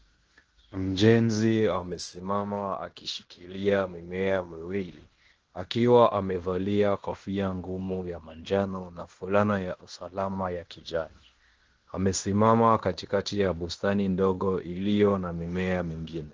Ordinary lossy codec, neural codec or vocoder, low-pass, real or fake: Opus, 16 kbps; codec, 16 kHz in and 24 kHz out, 0.9 kbps, LongCat-Audio-Codec, fine tuned four codebook decoder; 7.2 kHz; fake